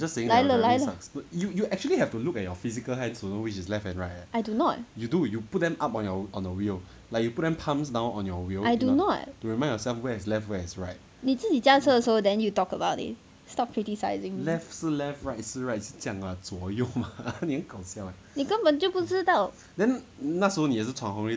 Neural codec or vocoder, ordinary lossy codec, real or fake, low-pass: none; none; real; none